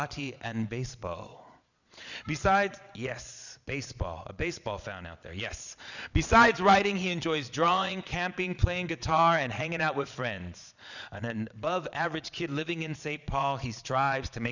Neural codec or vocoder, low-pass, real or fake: vocoder, 22.05 kHz, 80 mel bands, Vocos; 7.2 kHz; fake